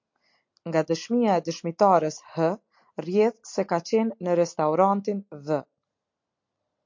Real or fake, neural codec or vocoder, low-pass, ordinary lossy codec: real; none; 7.2 kHz; MP3, 48 kbps